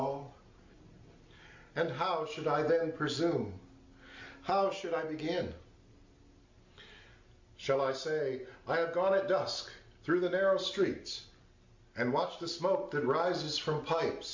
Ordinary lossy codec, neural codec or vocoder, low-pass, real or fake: MP3, 48 kbps; none; 7.2 kHz; real